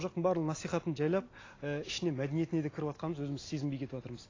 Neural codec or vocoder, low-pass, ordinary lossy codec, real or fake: none; 7.2 kHz; AAC, 32 kbps; real